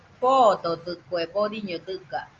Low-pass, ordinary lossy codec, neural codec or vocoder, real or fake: 7.2 kHz; Opus, 24 kbps; none; real